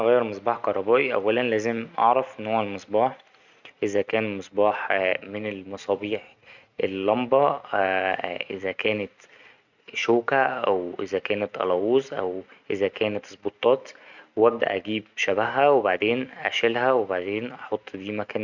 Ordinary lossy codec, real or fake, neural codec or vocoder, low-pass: none; real; none; 7.2 kHz